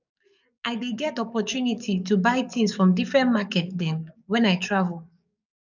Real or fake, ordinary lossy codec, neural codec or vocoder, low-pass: fake; none; codec, 44.1 kHz, 7.8 kbps, DAC; 7.2 kHz